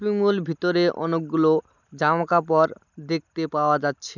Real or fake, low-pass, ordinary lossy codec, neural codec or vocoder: real; 7.2 kHz; none; none